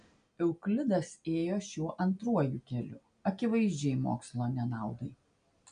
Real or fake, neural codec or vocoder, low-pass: real; none; 9.9 kHz